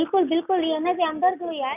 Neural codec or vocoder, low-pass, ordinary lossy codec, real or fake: none; 3.6 kHz; none; real